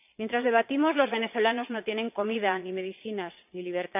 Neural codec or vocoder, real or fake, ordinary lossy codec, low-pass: vocoder, 22.05 kHz, 80 mel bands, Vocos; fake; none; 3.6 kHz